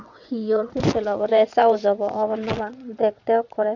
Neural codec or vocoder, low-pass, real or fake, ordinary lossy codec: vocoder, 22.05 kHz, 80 mel bands, WaveNeXt; 7.2 kHz; fake; none